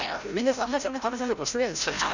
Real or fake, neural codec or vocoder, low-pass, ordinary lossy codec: fake; codec, 16 kHz, 0.5 kbps, FreqCodec, larger model; 7.2 kHz; none